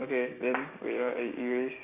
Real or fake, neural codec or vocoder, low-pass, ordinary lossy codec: fake; codec, 16 kHz, 6 kbps, DAC; 3.6 kHz; none